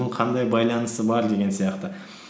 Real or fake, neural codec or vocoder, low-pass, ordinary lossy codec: real; none; none; none